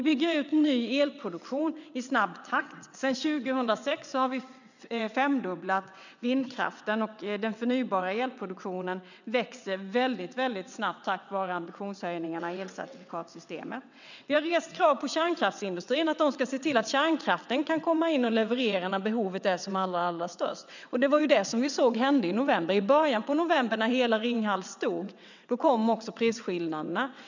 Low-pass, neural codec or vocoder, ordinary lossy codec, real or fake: 7.2 kHz; vocoder, 22.05 kHz, 80 mel bands, WaveNeXt; none; fake